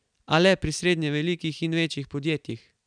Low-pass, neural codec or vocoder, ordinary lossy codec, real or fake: 9.9 kHz; none; none; real